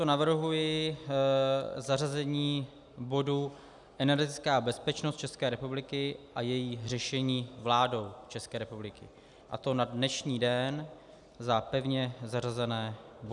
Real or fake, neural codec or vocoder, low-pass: real; none; 10.8 kHz